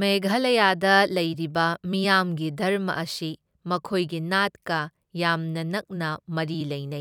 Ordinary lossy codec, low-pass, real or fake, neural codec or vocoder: none; 19.8 kHz; fake; vocoder, 44.1 kHz, 128 mel bands every 256 samples, BigVGAN v2